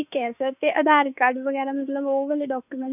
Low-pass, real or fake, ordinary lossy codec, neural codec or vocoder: 3.6 kHz; fake; none; autoencoder, 48 kHz, 32 numbers a frame, DAC-VAE, trained on Japanese speech